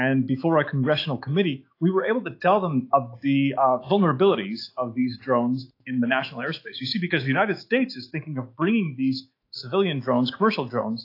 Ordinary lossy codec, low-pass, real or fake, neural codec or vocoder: AAC, 32 kbps; 5.4 kHz; fake; autoencoder, 48 kHz, 128 numbers a frame, DAC-VAE, trained on Japanese speech